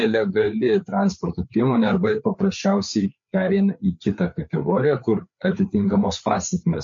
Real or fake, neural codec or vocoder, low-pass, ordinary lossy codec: fake; codec, 16 kHz, 4 kbps, FreqCodec, larger model; 7.2 kHz; MP3, 48 kbps